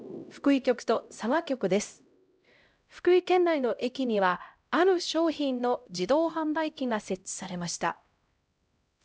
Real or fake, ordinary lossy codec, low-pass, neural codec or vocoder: fake; none; none; codec, 16 kHz, 0.5 kbps, X-Codec, HuBERT features, trained on LibriSpeech